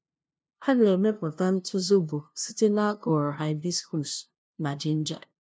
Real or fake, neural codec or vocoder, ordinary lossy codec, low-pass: fake; codec, 16 kHz, 0.5 kbps, FunCodec, trained on LibriTTS, 25 frames a second; none; none